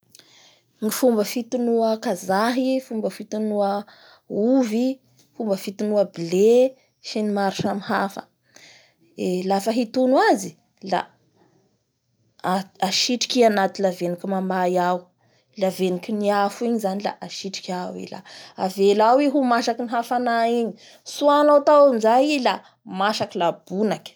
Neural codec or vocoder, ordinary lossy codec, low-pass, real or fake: none; none; none; real